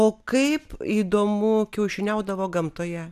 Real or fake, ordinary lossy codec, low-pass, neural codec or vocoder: real; AAC, 96 kbps; 14.4 kHz; none